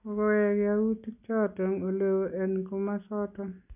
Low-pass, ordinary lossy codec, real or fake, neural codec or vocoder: 3.6 kHz; none; real; none